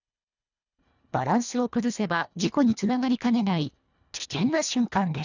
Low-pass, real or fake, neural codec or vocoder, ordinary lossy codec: 7.2 kHz; fake; codec, 24 kHz, 1.5 kbps, HILCodec; none